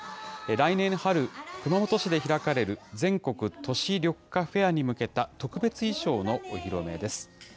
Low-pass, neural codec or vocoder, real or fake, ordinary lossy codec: none; none; real; none